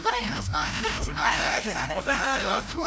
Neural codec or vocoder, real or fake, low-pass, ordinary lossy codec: codec, 16 kHz, 0.5 kbps, FreqCodec, larger model; fake; none; none